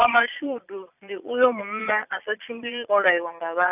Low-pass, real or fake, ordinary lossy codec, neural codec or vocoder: 3.6 kHz; fake; none; vocoder, 22.05 kHz, 80 mel bands, Vocos